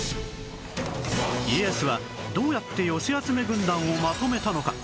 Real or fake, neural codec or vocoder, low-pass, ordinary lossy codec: real; none; none; none